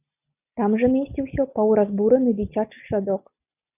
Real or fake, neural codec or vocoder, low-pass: real; none; 3.6 kHz